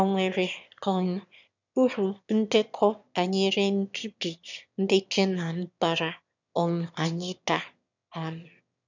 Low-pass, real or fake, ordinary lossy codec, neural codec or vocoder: 7.2 kHz; fake; none; autoencoder, 22.05 kHz, a latent of 192 numbers a frame, VITS, trained on one speaker